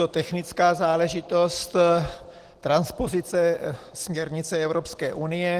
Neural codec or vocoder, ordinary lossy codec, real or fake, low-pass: none; Opus, 16 kbps; real; 14.4 kHz